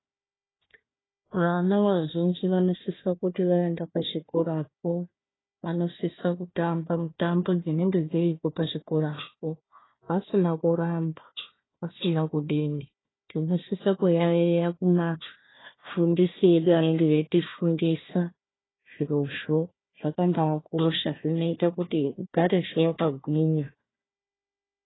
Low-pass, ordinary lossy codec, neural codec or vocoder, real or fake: 7.2 kHz; AAC, 16 kbps; codec, 16 kHz, 1 kbps, FunCodec, trained on Chinese and English, 50 frames a second; fake